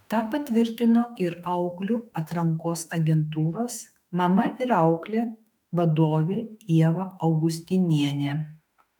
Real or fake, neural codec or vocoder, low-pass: fake; autoencoder, 48 kHz, 32 numbers a frame, DAC-VAE, trained on Japanese speech; 19.8 kHz